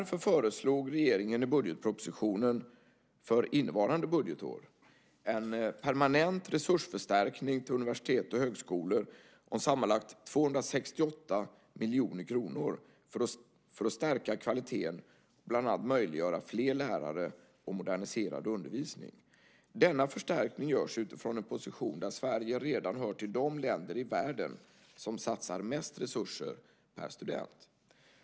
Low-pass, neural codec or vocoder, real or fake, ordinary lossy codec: none; none; real; none